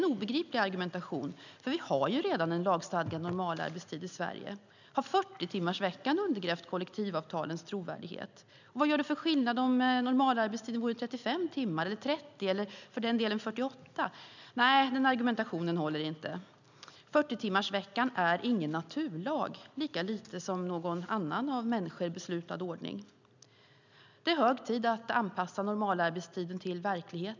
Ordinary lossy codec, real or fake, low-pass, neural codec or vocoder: none; real; 7.2 kHz; none